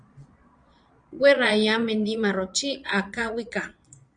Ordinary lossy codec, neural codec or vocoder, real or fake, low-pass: Opus, 64 kbps; vocoder, 22.05 kHz, 80 mel bands, Vocos; fake; 9.9 kHz